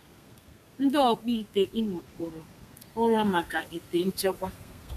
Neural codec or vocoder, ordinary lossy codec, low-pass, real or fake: codec, 32 kHz, 1.9 kbps, SNAC; none; 14.4 kHz; fake